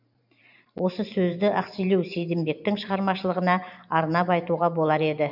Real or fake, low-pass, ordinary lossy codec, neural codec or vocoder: fake; 5.4 kHz; none; vocoder, 44.1 kHz, 128 mel bands every 512 samples, BigVGAN v2